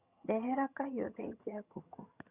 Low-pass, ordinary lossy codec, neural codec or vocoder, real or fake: 3.6 kHz; Opus, 64 kbps; vocoder, 22.05 kHz, 80 mel bands, HiFi-GAN; fake